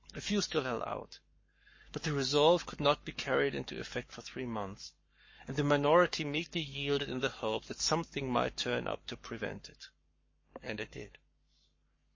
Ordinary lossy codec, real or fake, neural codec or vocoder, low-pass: MP3, 32 kbps; fake; codec, 44.1 kHz, 7.8 kbps, Pupu-Codec; 7.2 kHz